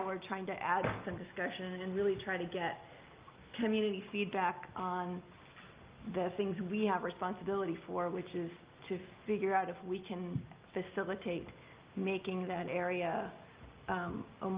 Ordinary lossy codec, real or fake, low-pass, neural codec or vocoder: Opus, 32 kbps; real; 3.6 kHz; none